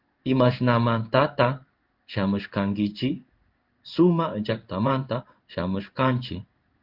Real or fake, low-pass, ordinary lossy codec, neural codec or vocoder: fake; 5.4 kHz; Opus, 32 kbps; codec, 16 kHz in and 24 kHz out, 1 kbps, XY-Tokenizer